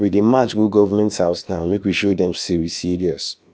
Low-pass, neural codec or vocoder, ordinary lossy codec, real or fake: none; codec, 16 kHz, about 1 kbps, DyCAST, with the encoder's durations; none; fake